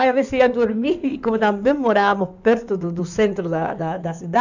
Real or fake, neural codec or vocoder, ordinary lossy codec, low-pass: fake; codec, 16 kHz in and 24 kHz out, 2.2 kbps, FireRedTTS-2 codec; none; 7.2 kHz